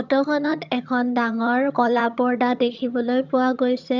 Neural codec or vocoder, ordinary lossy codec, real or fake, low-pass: vocoder, 22.05 kHz, 80 mel bands, HiFi-GAN; none; fake; 7.2 kHz